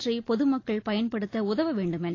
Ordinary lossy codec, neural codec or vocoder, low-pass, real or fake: AAC, 32 kbps; none; 7.2 kHz; real